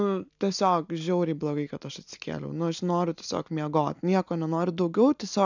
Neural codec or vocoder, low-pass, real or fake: none; 7.2 kHz; real